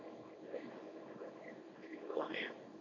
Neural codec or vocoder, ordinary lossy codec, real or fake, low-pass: codec, 24 kHz, 0.9 kbps, WavTokenizer, small release; MP3, 48 kbps; fake; 7.2 kHz